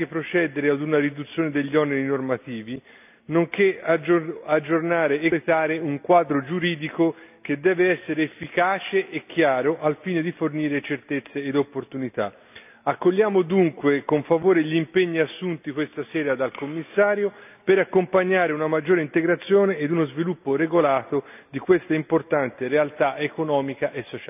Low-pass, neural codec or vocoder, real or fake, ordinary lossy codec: 3.6 kHz; none; real; none